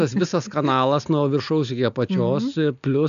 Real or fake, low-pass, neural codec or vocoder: real; 7.2 kHz; none